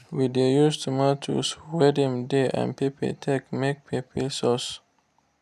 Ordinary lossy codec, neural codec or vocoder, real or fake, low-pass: none; none; real; 14.4 kHz